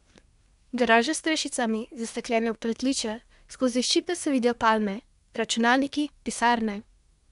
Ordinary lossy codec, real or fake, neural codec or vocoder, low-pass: none; fake; codec, 24 kHz, 1 kbps, SNAC; 10.8 kHz